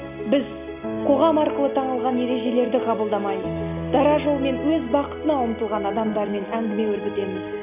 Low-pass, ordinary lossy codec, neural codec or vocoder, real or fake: 3.6 kHz; none; none; real